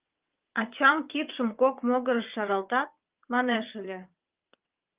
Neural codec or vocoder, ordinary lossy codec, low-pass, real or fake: vocoder, 22.05 kHz, 80 mel bands, WaveNeXt; Opus, 32 kbps; 3.6 kHz; fake